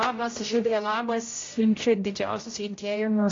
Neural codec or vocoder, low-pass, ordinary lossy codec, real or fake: codec, 16 kHz, 0.5 kbps, X-Codec, HuBERT features, trained on general audio; 7.2 kHz; AAC, 32 kbps; fake